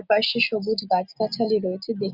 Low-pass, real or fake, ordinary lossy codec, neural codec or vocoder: 5.4 kHz; real; Opus, 64 kbps; none